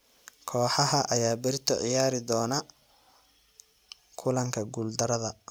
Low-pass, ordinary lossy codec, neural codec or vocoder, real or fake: none; none; none; real